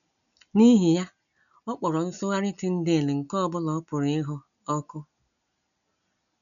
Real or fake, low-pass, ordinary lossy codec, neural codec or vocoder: real; 7.2 kHz; MP3, 96 kbps; none